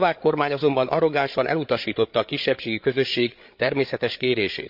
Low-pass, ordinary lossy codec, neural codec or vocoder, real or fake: 5.4 kHz; MP3, 48 kbps; codec, 16 kHz, 8 kbps, FreqCodec, larger model; fake